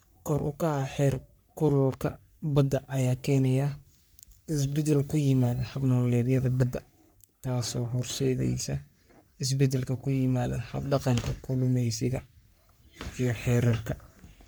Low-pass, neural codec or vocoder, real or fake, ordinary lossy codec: none; codec, 44.1 kHz, 3.4 kbps, Pupu-Codec; fake; none